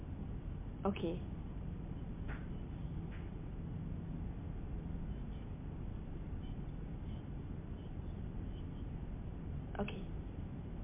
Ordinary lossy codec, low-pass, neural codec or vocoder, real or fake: MP3, 24 kbps; 3.6 kHz; none; real